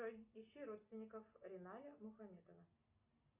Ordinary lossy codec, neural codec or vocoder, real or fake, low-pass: Opus, 64 kbps; none; real; 3.6 kHz